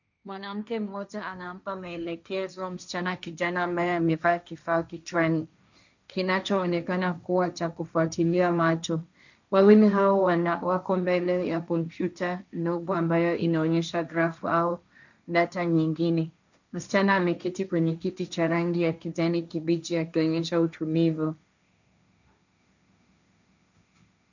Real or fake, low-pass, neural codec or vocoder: fake; 7.2 kHz; codec, 16 kHz, 1.1 kbps, Voila-Tokenizer